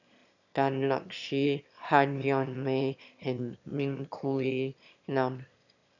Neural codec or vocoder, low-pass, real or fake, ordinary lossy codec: autoencoder, 22.05 kHz, a latent of 192 numbers a frame, VITS, trained on one speaker; 7.2 kHz; fake; none